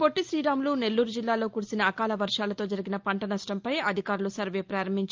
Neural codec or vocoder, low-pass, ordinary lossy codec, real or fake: none; 7.2 kHz; Opus, 32 kbps; real